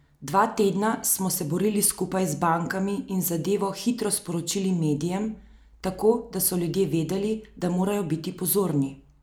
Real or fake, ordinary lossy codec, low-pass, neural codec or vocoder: real; none; none; none